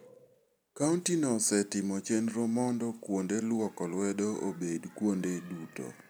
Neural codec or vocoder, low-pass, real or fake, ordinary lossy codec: none; none; real; none